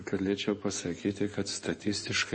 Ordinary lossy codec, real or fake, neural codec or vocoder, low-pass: MP3, 32 kbps; fake; codec, 16 kHz in and 24 kHz out, 2.2 kbps, FireRedTTS-2 codec; 9.9 kHz